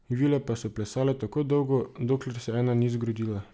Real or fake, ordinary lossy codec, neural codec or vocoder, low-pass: real; none; none; none